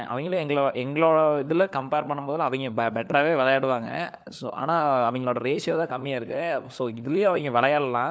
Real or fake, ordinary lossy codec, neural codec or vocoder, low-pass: fake; none; codec, 16 kHz, 4 kbps, FunCodec, trained on LibriTTS, 50 frames a second; none